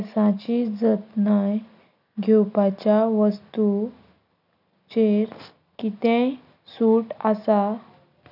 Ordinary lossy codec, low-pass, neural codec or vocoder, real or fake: none; 5.4 kHz; none; real